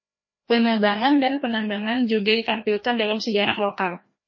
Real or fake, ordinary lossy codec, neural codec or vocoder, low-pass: fake; MP3, 32 kbps; codec, 16 kHz, 1 kbps, FreqCodec, larger model; 7.2 kHz